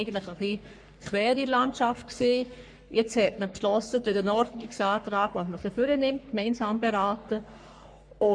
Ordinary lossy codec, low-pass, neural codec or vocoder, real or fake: MP3, 64 kbps; 9.9 kHz; codec, 44.1 kHz, 3.4 kbps, Pupu-Codec; fake